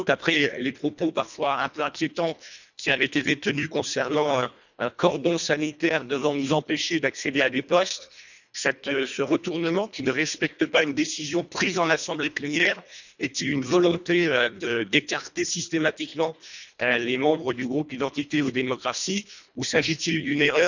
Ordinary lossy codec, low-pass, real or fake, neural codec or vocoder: none; 7.2 kHz; fake; codec, 24 kHz, 1.5 kbps, HILCodec